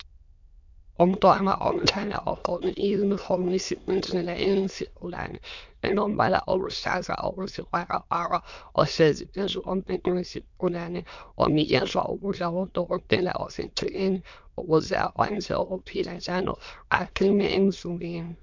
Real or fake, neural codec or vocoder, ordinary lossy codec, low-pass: fake; autoencoder, 22.05 kHz, a latent of 192 numbers a frame, VITS, trained on many speakers; MP3, 64 kbps; 7.2 kHz